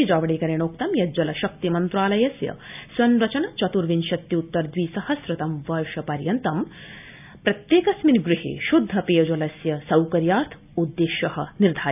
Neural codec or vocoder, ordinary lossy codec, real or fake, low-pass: none; none; real; 3.6 kHz